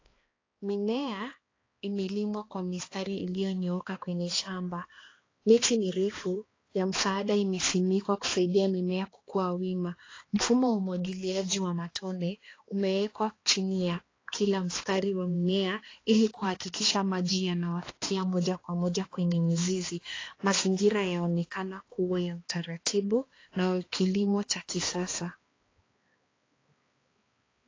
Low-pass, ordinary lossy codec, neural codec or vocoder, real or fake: 7.2 kHz; AAC, 32 kbps; codec, 16 kHz, 2 kbps, X-Codec, HuBERT features, trained on balanced general audio; fake